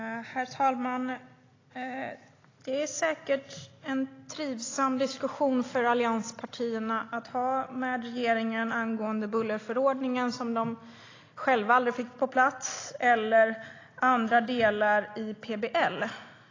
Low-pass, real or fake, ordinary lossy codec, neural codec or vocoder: 7.2 kHz; real; AAC, 32 kbps; none